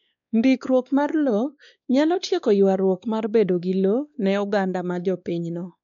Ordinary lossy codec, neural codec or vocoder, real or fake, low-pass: none; codec, 16 kHz, 2 kbps, X-Codec, WavLM features, trained on Multilingual LibriSpeech; fake; 7.2 kHz